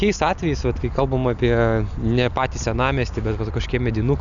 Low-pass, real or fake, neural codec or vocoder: 7.2 kHz; real; none